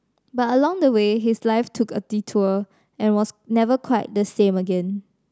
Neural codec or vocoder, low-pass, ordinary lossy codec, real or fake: none; none; none; real